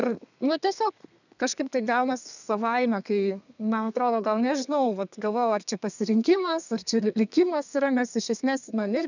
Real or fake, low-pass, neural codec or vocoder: fake; 7.2 kHz; codec, 32 kHz, 1.9 kbps, SNAC